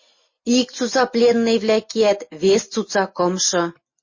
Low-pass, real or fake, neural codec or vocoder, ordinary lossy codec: 7.2 kHz; real; none; MP3, 32 kbps